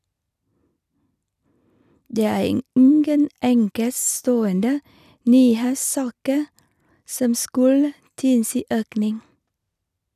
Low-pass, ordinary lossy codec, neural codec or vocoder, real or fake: 14.4 kHz; none; none; real